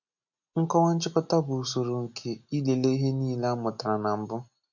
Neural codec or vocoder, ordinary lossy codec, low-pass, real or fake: none; none; 7.2 kHz; real